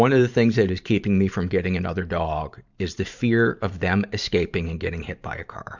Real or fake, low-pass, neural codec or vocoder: fake; 7.2 kHz; vocoder, 44.1 kHz, 128 mel bands every 512 samples, BigVGAN v2